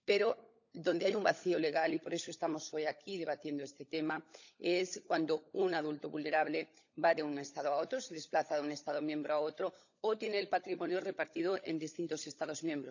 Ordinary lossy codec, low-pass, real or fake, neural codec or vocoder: none; 7.2 kHz; fake; codec, 16 kHz, 16 kbps, FunCodec, trained on LibriTTS, 50 frames a second